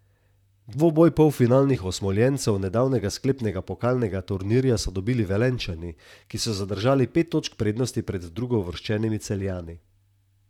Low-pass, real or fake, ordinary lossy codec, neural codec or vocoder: 19.8 kHz; fake; none; vocoder, 44.1 kHz, 128 mel bands, Pupu-Vocoder